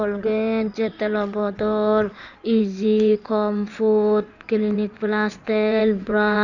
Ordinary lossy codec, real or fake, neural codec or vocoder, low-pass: none; fake; codec, 16 kHz in and 24 kHz out, 2.2 kbps, FireRedTTS-2 codec; 7.2 kHz